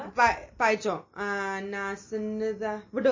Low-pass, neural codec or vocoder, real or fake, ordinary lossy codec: 7.2 kHz; none; real; MP3, 32 kbps